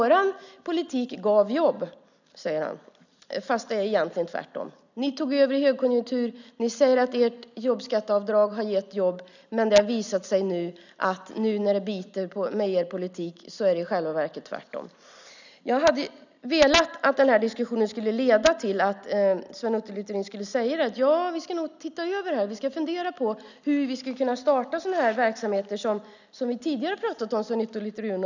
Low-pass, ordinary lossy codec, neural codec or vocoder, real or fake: 7.2 kHz; none; none; real